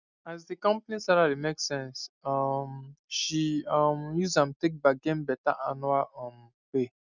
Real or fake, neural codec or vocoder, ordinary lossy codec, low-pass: real; none; none; 7.2 kHz